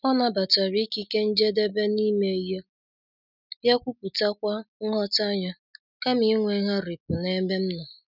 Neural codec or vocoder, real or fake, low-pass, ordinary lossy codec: none; real; 5.4 kHz; none